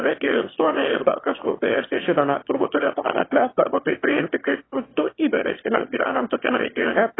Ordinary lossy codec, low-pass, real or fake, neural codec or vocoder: AAC, 16 kbps; 7.2 kHz; fake; autoencoder, 22.05 kHz, a latent of 192 numbers a frame, VITS, trained on one speaker